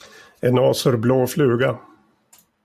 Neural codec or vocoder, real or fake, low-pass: none; real; 14.4 kHz